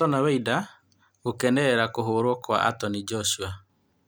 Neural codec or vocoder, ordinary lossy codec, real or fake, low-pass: none; none; real; none